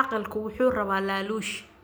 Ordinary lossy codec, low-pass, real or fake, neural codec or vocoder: none; none; real; none